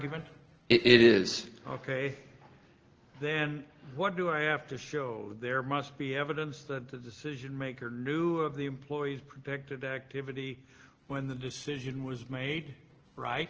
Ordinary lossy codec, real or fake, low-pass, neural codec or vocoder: Opus, 16 kbps; real; 7.2 kHz; none